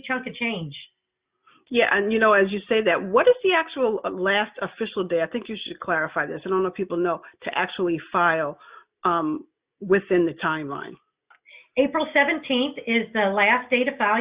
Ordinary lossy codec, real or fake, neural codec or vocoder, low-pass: Opus, 64 kbps; real; none; 3.6 kHz